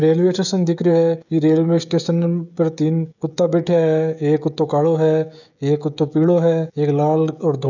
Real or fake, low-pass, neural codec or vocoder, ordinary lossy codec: fake; 7.2 kHz; codec, 16 kHz, 16 kbps, FreqCodec, smaller model; none